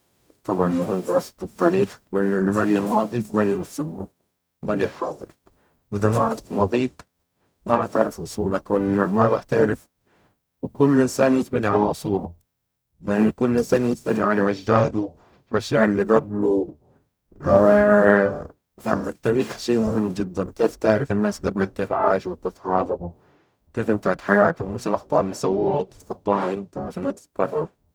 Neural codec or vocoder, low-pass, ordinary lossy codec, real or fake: codec, 44.1 kHz, 0.9 kbps, DAC; none; none; fake